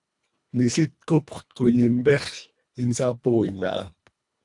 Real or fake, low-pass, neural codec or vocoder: fake; 10.8 kHz; codec, 24 kHz, 1.5 kbps, HILCodec